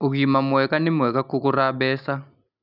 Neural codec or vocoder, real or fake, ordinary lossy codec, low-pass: none; real; none; 5.4 kHz